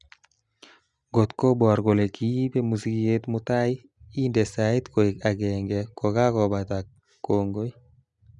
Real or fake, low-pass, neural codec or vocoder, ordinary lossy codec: real; 10.8 kHz; none; none